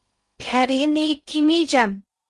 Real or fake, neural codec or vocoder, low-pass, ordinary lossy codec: fake; codec, 16 kHz in and 24 kHz out, 0.6 kbps, FocalCodec, streaming, 2048 codes; 10.8 kHz; Opus, 24 kbps